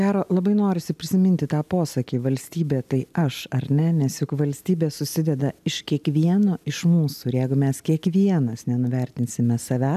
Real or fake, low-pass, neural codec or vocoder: real; 14.4 kHz; none